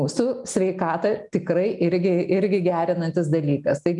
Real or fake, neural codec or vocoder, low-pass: real; none; 10.8 kHz